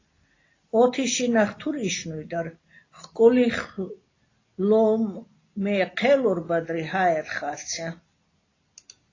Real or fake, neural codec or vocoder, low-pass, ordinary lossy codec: real; none; 7.2 kHz; AAC, 32 kbps